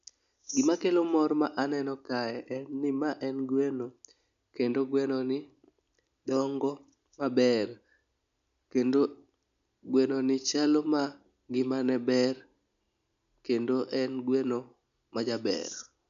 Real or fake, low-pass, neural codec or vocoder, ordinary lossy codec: real; 7.2 kHz; none; none